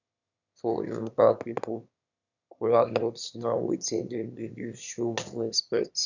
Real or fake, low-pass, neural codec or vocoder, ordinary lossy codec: fake; 7.2 kHz; autoencoder, 22.05 kHz, a latent of 192 numbers a frame, VITS, trained on one speaker; none